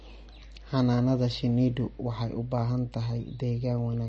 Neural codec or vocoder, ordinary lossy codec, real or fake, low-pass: none; MP3, 32 kbps; real; 10.8 kHz